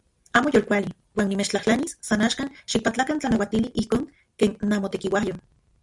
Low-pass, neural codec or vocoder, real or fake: 10.8 kHz; none; real